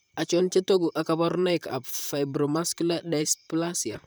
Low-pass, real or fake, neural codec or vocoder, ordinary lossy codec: none; fake; vocoder, 44.1 kHz, 128 mel bands, Pupu-Vocoder; none